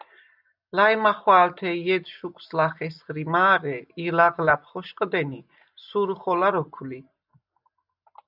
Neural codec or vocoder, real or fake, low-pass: none; real; 5.4 kHz